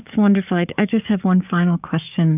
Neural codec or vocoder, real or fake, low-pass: codec, 44.1 kHz, 7.8 kbps, DAC; fake; 3.6 kHz